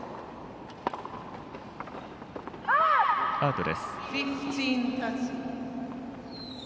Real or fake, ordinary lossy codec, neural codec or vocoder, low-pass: real; none; none; none